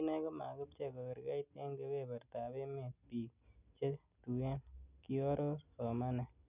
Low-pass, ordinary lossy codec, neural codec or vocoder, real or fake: 3.6 kHz; none; none; real